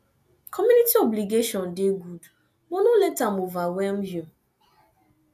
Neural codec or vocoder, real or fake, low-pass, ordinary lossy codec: none; real; 14.4 kHz; none